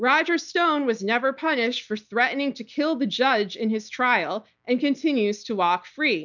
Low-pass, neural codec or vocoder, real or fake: 7.2 kHz; none; real